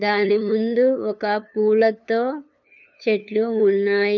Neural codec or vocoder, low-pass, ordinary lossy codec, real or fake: codec, 16 kHz, 2 kbps, FunCodec, trained on LibriTTS, 25 frames a second; 7.2 kHz; none; fake